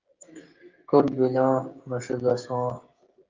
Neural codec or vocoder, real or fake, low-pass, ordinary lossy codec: codec, 16 kHz, 16 kbps, FreqCodec, smaller model; fake; 7.2 kHz; Opus, 32 kbps